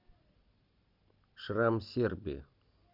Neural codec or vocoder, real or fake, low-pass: none; real; 5.4 kHz